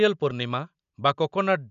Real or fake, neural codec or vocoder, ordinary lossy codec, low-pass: real; none; none; 7.2 kHz